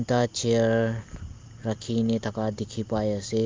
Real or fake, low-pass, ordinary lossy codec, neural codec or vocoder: real; 7.2 kHz; Opus, 24 kbps; none